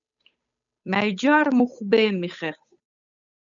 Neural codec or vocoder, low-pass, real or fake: codec, 16 kHz, 8 kbps, FunCodec, trained on Chinese and English, 25 frames a second; 7.2 kHz; fake